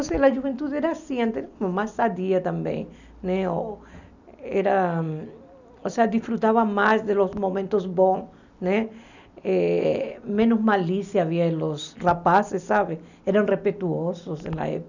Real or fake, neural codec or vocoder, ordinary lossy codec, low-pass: real; none; none; 7.2 kHz